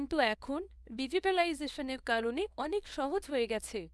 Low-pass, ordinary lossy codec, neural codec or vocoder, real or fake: none; none; codec, 24 kHz, 0.9 kbps, WavTokenizer, small release; fake